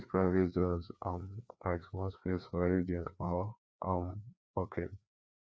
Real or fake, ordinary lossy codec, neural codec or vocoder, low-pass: fake; none; codec, 16 kHz, 2 kbps, FreqCodec, larger model; none